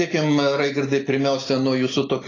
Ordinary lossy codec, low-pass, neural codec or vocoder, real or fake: AAC, 32 kbps; 7.2 kHz; none; real